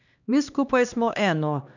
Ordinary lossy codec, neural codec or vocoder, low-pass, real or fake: none; codec, 16 kHz, 2 kbps, X-Codec, HuBERT features, trained on LibriSpeech; 7.2 kHz; fake